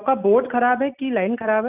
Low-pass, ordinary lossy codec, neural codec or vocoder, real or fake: 3.6 kHz; none; none; real